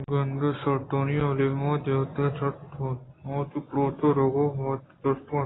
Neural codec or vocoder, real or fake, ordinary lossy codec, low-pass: none; real; AAC, 16 kbps; 7.2 kHz